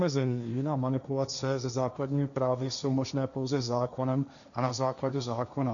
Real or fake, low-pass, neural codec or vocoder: fake; 7.2 kHz; codec, 16 kHz, 1.1 kbps, Voila-Tokenizer